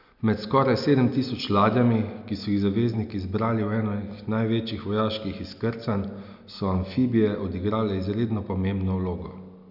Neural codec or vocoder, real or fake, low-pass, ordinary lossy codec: none; real; 5.4 kHz; none